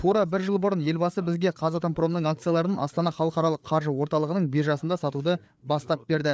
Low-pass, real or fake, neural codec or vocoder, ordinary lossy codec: none; fake; codec, 16 kHz, 4 kbps, FreqCodec, larger model; none